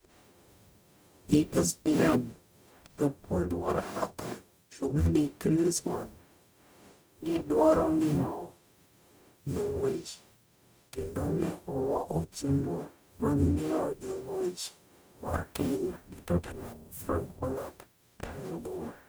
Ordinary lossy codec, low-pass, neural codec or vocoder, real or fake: none; none; codec, 44.1 kHz, 0.9 kbps, DAC; fake